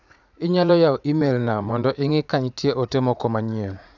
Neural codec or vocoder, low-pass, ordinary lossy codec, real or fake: vocoder, 44.1 kHz, 80 mel bands, Vocos; 7.2 kHz; none; fake